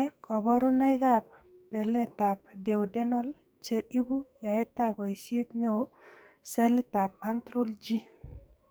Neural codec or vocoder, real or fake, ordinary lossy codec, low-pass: codec, 44.1 kHz, 2.6 kbps, SNAC; fake; none; none